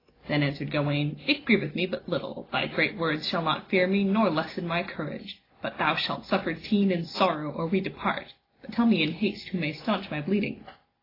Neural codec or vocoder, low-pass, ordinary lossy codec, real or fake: none; 5.4 kHz; AAC, 24 kbps; real